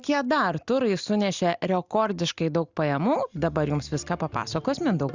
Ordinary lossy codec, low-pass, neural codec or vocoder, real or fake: Opus, 64 kbps; 7.2 kHz; none; real